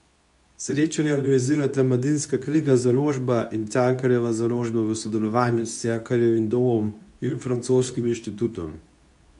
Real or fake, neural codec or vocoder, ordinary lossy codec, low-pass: fake; codec, 24 kHz, 0.9 kbps, WavTokenizer, medium speech release version 2; AAC, 96 kbps; 10.8 kHz